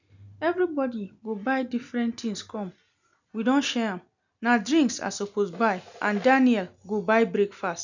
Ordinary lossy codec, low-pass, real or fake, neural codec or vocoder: none; 7.2 kHz; real; none